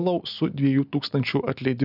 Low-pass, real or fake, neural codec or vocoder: 5.4 kHz; real; none